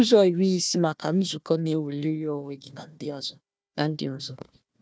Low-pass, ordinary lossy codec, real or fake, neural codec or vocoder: none; none; fake; codec, 16 kHz, 1 kbps, FunCodec, trained on Chinese and English, 50 frames a second